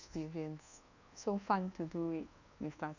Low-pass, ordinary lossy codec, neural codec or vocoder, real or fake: 7.2 kHz; none; codec, 24 kHz, 1.2 kbps, DualCodec; fake